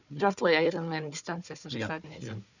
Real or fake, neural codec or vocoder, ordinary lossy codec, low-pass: fake; codec, 16 kHz, 4 kbps, FunCodec, trained on Chinese and English, 50 frames a second; none; 7.2 kHz